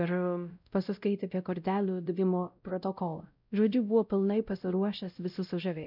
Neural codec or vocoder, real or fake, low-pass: codec, 16 kHz, 0.5 kbps, X-Codec, WavLM features, trained on Multilingual LibriSpeech; fake; 5.4 kHz